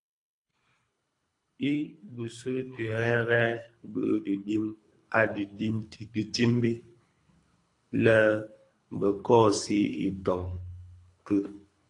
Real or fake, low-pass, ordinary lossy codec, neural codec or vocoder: fake; 10.8 kHz; AAC, 48 kbps; codec, 24 kHz, 3 kbps, HILCodec